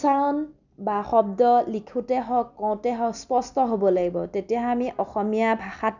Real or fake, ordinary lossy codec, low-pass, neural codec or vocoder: real; none; 7.2 kHz; none